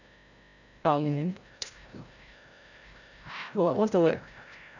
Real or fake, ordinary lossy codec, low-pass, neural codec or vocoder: fake; none; 7.2 kHz; codec, 16 kHz, 0.5 kbps, FreqCodec, larger model